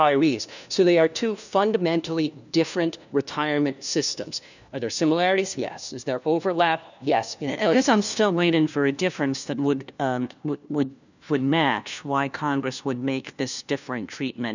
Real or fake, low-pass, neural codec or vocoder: fake; 7.2 kHz; codec, 16 kHz, 1 kbps, FunCodec, trained on LibriTTS, 50 frames a second